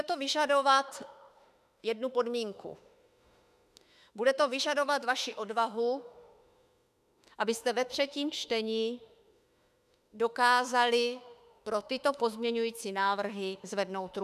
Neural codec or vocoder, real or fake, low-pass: autoencoder, 48 kHz, 32 numbers a frame, DAC-VAE, trained on Japanese speech; fake; 14.4 kHz